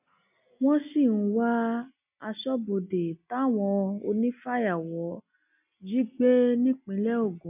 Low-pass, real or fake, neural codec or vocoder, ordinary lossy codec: 3.6 kHz; real; none; none